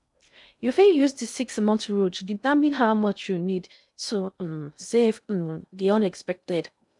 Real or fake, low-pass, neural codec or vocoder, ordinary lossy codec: fake; 10.8 kHz; codec, 16 kHz in and 24 kHz out, 0.6 kbps, FocalCodec, streaming, 2048 codes; none